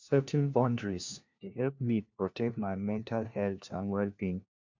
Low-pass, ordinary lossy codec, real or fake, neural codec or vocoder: 7.2 kHz; MP3, 64 kbps; fake; codec, 16 kHz, 1 kbps, FunCodec, trained on LibriTTS, 50 frames a second